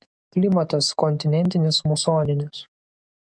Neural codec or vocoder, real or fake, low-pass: codec, 44.1 kHz, 7.8 kbps, DAC; fake; 9.9 kHz